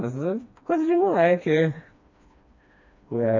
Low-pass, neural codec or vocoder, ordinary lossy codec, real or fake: 7.2 kHz; codec, 16 kHz, 2 kbps, FreqCodec, smaller model; AAC, 48 kbps; fake